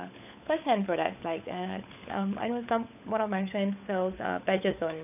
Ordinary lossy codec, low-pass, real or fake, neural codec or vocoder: none; 3.6 kHz; fake; codec, 16 kHz, 16 kbps, FunCodec, trained on LibriTTS, 50 frames a second